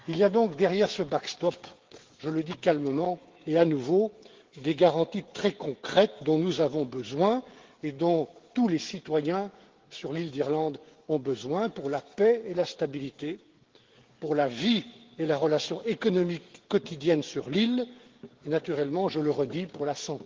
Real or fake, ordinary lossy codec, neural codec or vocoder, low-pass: fake; Opus, 16 kbps; codec, 16 kHz, 16 kbps, FreqCodec, smaller model; 7.2 kHz